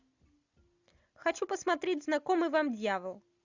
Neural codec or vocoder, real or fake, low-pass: none; real; 7.2 kHz